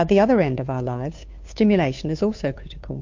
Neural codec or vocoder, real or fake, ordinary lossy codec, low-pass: codec, 16 kHz, 2 kbps, FunCodec, trained on Chinese and English, 25 frames a second; fake; MP3, 48 kbps; 7.2 kHz